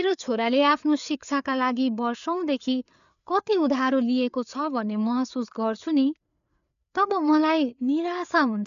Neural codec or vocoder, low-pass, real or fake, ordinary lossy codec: codec, 16 kHz, 4 kbps, FreqCodec, larger model; 7.2 kHz; fake; MP3, 96 kbps